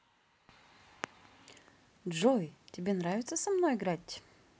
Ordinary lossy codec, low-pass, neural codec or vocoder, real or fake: none; none; none; real